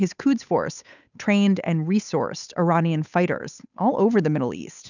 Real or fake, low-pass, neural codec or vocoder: fake; 7.2 kHz; codec, 16 kHz, 8 kbps, FunCodec, trained on Chinese and English, 25 frames a second